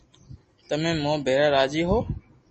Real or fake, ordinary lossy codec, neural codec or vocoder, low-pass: real; MP3, 32 kbps; none; 10.8 kHz